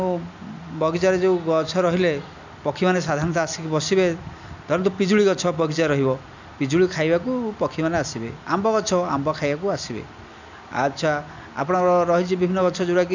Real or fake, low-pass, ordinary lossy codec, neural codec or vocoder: real; 7.2 kHz; none; none